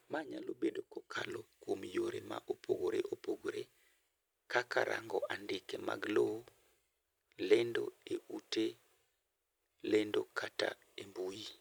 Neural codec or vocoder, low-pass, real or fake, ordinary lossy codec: none; none; real; none